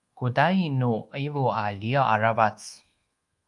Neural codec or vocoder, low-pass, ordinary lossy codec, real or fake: codec, 24 kHz, 1.2 kbps, DualCodec; 10.8 kHz; Opus, 32 kbps; fake